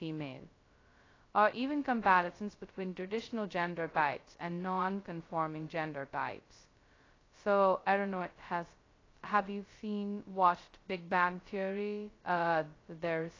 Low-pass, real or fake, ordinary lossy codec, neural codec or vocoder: 7.2 kHz; fake; AAC, 32 kbps; codec, 16 kHz, 0.2 kbps, FocalCodec